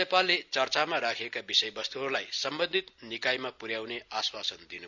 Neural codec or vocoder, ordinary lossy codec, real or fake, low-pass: none; none; real; 7.2 kHz